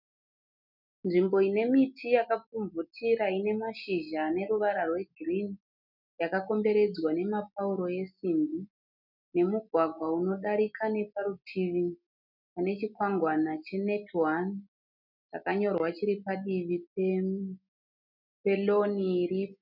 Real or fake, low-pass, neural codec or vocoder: real; 5.4 kHz; none